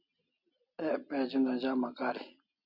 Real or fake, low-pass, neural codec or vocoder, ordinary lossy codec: real; 5.4 kHz; none; Opus, 64 kbps